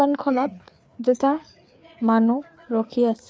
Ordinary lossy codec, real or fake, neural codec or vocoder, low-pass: none; fake; codec, 16 kHz, 4 kbps, FreqCodec, larger model; none